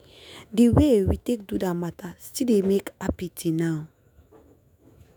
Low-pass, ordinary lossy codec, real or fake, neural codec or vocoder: none; none; fake; autoencoder, 48 kHz, 128 numbers a frame, DAC-VAE, trained on Japanese speech